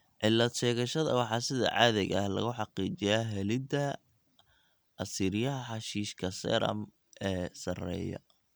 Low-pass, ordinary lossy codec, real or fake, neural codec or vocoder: none; none; real; none